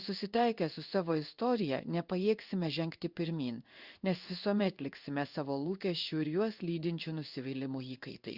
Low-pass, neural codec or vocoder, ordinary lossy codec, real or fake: 5.4 kHz; codec, 16 kHz in and 24 kHz out, 1 kbps, XY-Tokenizer; Opus, 64 kbps; fake